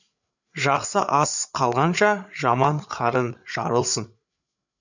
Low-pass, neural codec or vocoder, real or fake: 7.2 kHz; codec, 16 kHz, 8 kbps, FreqCodec, larger model; fake